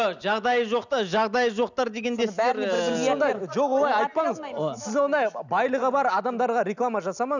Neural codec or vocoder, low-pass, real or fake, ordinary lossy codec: none; 7.2 kHz; real; none